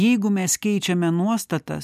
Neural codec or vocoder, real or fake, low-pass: none; real; 14.4 kHz